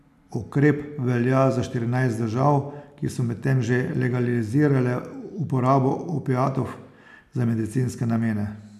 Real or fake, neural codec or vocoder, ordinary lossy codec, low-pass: real; none; none; 14.4 kHz